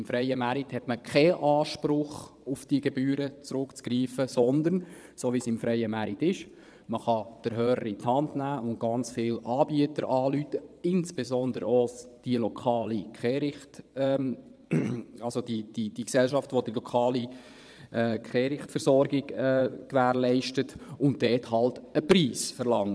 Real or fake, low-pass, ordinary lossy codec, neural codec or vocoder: fake; none; none; vocoder, 22.05 kHz, 80 mel bands, Vocos